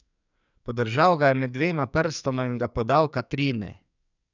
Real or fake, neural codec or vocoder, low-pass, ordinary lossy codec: fake; codec, 44.1 kHz, 2.6 kbps, SNAC; 7.2 kHz; none